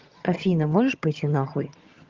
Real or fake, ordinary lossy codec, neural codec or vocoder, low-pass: fake; Opus, 32 kbps; vocoder, 22.05 kHz, 80 mel bands, HiFi-GAN; 7.2 kHz